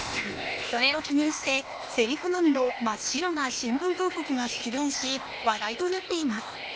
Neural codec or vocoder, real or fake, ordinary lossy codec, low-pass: codec, 16 kHz, 0.8 kbps, ZipCodec; fake; none; none